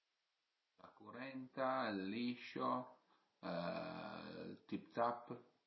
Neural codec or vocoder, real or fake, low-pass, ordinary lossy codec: none; real; 7.2 kHz; MP3, 24 kbps